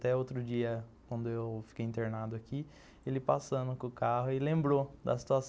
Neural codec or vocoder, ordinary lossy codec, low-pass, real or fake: none; none; none; real